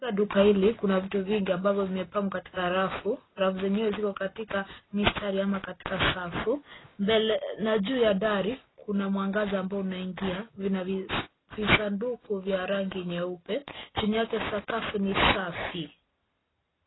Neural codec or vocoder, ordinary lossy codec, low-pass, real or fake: none; AAC, 16 kbps; 7.2 kHz; real